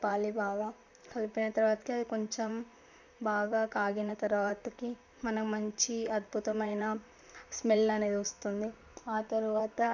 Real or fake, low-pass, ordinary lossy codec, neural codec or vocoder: fake; 7.2 kHz; none; vocoder, 44.1 kHz, 128 mel bands, Pupu-Vocoder